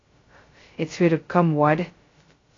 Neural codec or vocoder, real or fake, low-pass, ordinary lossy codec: codec, 16 kHz, 0.2 kbps, FocalCodec; fake; 7.2 kHz; AAC, 32 kbps